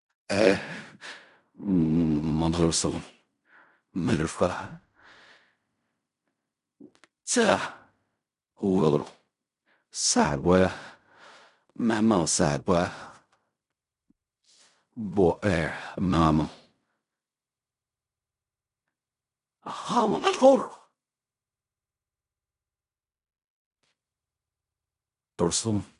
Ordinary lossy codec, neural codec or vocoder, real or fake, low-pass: none; codec, 16 kHz in and 24 kHz out, 0.4 kbps, LongCat-Audio-Codec, fine tuned four codebook decoder; fake; 10.8 kHz